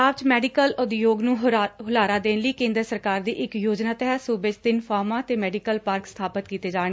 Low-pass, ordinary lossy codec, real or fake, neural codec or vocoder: none; none; real; none